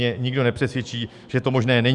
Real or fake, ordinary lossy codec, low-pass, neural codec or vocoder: fake; Opus, 64 kbps; 10.8 kHz; autoencoder, 48 kHz, 128 numbers a frame, DAC-VAE, trained on Japanese speech